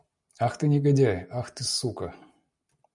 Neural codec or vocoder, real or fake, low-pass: none; real; 10.8 kHz